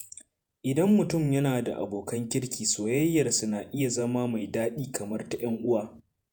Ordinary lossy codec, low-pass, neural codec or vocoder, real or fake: none; none; vocoder, 48 kHz, 128 mel bands, Vocos; fake